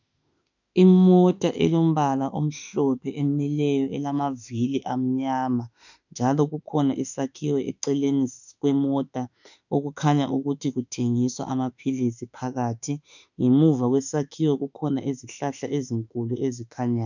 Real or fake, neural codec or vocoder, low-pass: fake; autoencoder, 48 kHz, 32 numbers a frame, DAC-VAE, trained on Japanese speech; 7.2 kHz